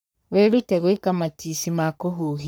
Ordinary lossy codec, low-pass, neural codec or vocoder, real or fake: none; none; codec, 44.1 kHz, 3.4 kbps, Pupu-Codec; fake